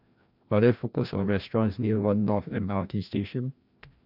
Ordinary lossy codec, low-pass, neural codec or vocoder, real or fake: none; 5.4 kHz; codec, 16 kHz, 1 kbps, FreqCodec, larger model; fake